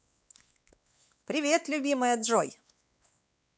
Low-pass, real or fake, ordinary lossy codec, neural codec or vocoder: none; fake; none; codec, 16 kHz, 4 kbps, X-Codec, WavLM features, trained on Multilingual LibriSpeech